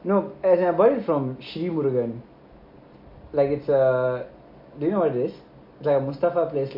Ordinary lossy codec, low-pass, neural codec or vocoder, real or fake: MP3, 32 kbps; 5.4 kHz; none; real